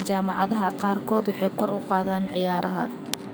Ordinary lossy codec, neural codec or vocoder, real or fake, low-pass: none; codec, 44.1 kHz, 2.6 kbps, SNAC; fake; none